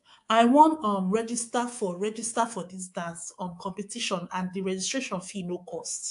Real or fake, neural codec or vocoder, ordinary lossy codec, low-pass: fake; codec, 24 kHz, 3.1 kbps, DualCodec; AAC, 64 kbps; 10.8 kHz